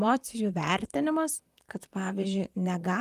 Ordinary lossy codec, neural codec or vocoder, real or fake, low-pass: Opus, 32 kbps; vocoder, 44.1 kHz, 128 mel bands, Pupu-Vocoder; fake; 14.4 kHz